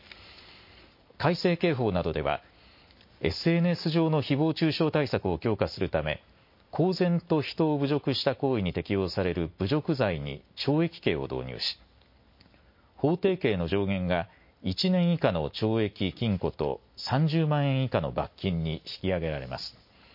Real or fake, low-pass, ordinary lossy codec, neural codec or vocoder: real; 5.4 kHz; MP3, 32 kbps; none